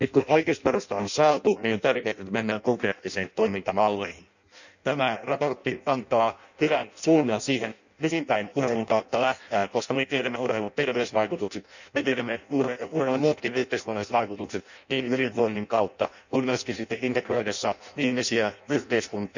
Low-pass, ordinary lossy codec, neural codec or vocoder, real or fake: 7.2 kHz; none; codec, 16 kHz in and 24 kHz out, 0.6 kbps, FireRedTTS-2 codec; fake